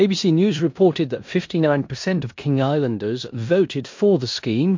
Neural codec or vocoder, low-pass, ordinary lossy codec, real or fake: codec, 16 kHz in and 24 kHz out, 0.9 kbps, LongCat-Audio-Codec, four codebook decoder; 7.2 kHz; MP3, 48 kbps; fake